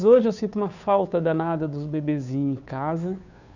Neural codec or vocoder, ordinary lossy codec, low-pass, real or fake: codec, 16 kHz, 2 kbps, FunCodec, trained on Chinese and English, 25 frames a second; none; 7.2 kHz; fake